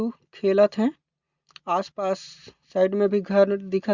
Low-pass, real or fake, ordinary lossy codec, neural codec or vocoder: 7.2 kHz; real; Opus, 64 kbps; none